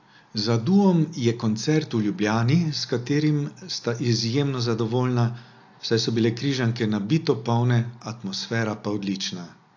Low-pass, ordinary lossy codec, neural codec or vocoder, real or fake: 7.2 kHz; AAC, 48 kbps; none; real